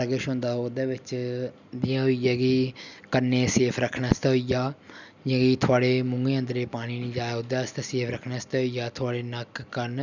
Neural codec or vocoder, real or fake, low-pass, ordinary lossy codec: none; real; 7.2 kHz; none